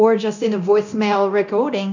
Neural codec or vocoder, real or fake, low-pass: codec, 24 kHz, 0.9 kbps, DualCodec; fake; 7.2 kHz